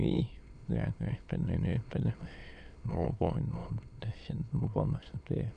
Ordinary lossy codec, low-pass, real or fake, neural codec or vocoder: none; 9.9 kHz; fake; autoencoder, 22.05 kHz, a latent of 192 numbers a frame, VITS, trained on many speakers